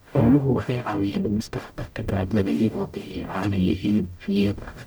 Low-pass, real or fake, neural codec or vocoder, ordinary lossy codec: none; fake; codec, 44.1 kHz, 0.9 kbps, DAC; none